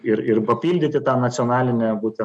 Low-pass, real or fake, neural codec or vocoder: 9.9 kHz; real; none